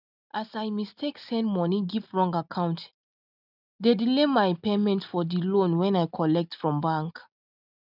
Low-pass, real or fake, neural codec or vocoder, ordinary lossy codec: 5.4 kHz; real; none; none